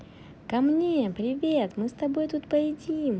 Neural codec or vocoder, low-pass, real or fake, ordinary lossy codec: none; none; real; none